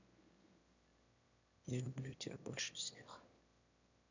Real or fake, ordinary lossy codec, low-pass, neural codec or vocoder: fake; none; 7.2 kHz; autoencoder, 22.05 kHz, a latent of 192 numbers a frame, VITS, trained on one speaker